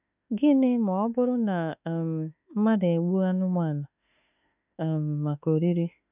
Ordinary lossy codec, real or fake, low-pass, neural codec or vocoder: none; fake; 3.6 kHz; autoencoder, 48 kHz, 32 numbers a frame, DAC-VAE, trained on Japanese speech